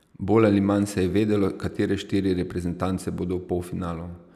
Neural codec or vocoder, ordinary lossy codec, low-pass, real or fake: none; none; 14.4 kHz; real